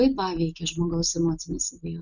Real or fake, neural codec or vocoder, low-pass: real; none; 7.2 kHz